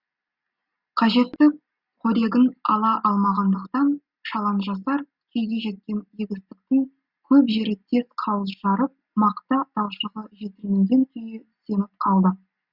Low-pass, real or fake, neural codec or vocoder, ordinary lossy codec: 5.4 kHz; real; none; Opus, 64 kbps